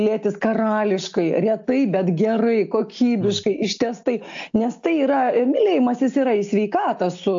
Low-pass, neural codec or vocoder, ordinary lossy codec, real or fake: 7.2 kHz; none; AAC, 64 kbps; real